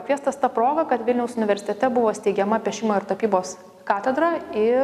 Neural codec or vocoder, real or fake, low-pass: none; real; 14.4 kHz